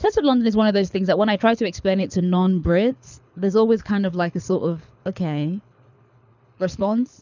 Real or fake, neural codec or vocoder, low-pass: fake; codec, 24 kHz, 6 kbps, HILCodec; 7.2 kHz